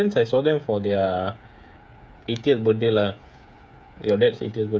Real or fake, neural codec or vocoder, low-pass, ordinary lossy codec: fake; codec, 16 kHz, 8 kbps, FreqCodec, smaller model; none; none